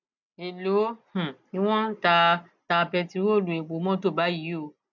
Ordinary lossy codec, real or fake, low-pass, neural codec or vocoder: none; real; 7.2 kHz; none